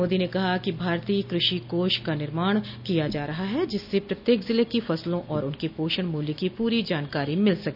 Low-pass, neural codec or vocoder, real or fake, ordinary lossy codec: 5.4 kHz; none; real; none